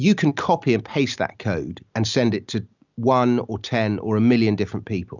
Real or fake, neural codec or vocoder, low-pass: real; none; 7.2 kHz